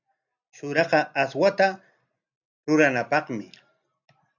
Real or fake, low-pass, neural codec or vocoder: real; 7.2 kHz; none